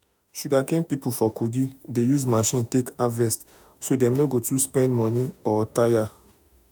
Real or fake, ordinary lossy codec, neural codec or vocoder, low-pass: fake; none; autoencoder, 48 kHz, 32 numbers a frame, DAC-VAE, trained on Japanese speech; none